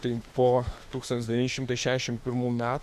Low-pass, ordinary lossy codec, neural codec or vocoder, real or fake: 14.4 kHz; Opus, 64 kbps; autoencoder, 48 kHz, 32 numbers a frame, DAC-VAE, trained on Japanese speech; fake